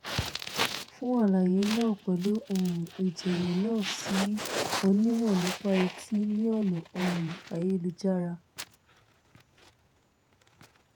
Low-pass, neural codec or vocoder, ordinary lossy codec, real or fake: none; vocoder, 48 kHz, 128 mel bands, Vocos; none; fake